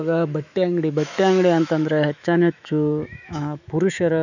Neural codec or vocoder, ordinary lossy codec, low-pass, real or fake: autoencoder, 48 kHz, 128 numbers a frame, DAC-VAE, trained on Japanese speech; none; 7.2 kHz; fake